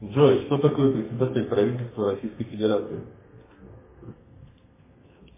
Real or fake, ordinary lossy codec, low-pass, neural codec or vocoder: fake; MP3, 16 kbps; 3.6 kHz; codec, 32 kHz, 1.9 kbps, SNAC